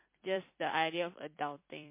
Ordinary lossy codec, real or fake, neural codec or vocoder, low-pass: MP3, 24 kbps; fake; vocoder, 44.1 kHz, 128 mel bands every 256 samples, BigVGAN v2; 3.6 kHz